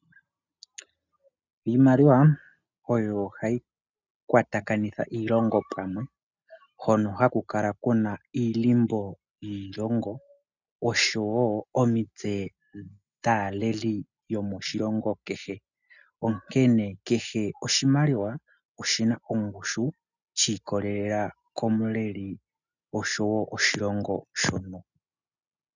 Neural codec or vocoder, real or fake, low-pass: none; real; 7.2 kHz